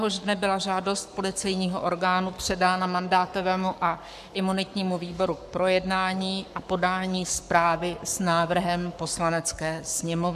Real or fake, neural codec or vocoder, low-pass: fake; codec, 44.1 kHz, 7.8 kbps, Pupu-Codec; 14.4 kHz